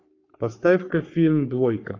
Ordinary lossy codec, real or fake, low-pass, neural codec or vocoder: AAC, 48 kbps; fake; 7.2 kHz; codec, 44.1 kHz, 3.4 kbps, Pupu-Codec